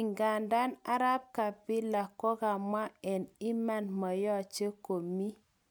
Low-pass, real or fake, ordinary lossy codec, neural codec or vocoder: none; real; none; none